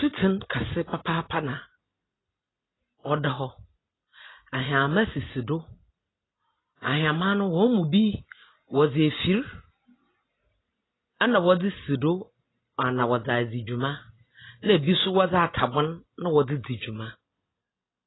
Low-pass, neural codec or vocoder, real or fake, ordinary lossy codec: 7.2 kHz; none; real; AAC, 16 kbps